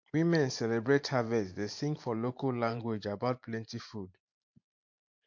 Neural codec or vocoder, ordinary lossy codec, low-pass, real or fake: vocoder, 44.1 kHz, 128 mel bands every 512 samples, BigVGAN v2; MP3, 48 kbps; 7.2 kHz; fake